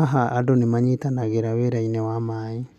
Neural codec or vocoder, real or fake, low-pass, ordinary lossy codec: none; real; 14.4 kHz; none